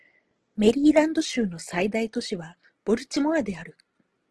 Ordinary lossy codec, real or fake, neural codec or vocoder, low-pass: Opus, 16 kbps; real; none; 10.8 kHz